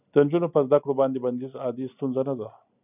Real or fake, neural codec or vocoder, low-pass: real; none; 3.6 kHz